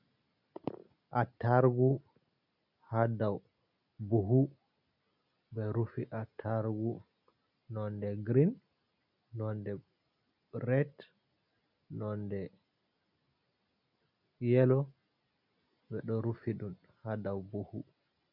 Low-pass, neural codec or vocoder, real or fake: 5.4 kHz; none; real